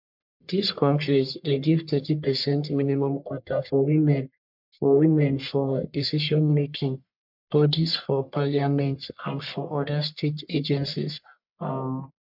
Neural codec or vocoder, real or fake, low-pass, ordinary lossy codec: codec, 44.1 kHz, 1.7 kbps, Pupu-Codec; fake; 5.4 kHz; MP3, 48 kbps